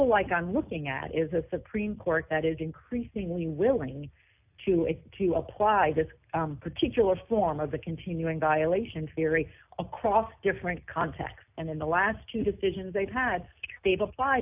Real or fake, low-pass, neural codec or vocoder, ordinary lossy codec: real; 3.6 kHz; none; AAC, 32 kbps